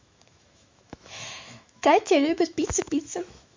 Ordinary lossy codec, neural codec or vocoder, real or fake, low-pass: MP3, 48 kbps; autoencoder, 48 kHz, 128 numbers a frame, DAC-VAE, trained on Japanese speech; fake; 7.2 kHz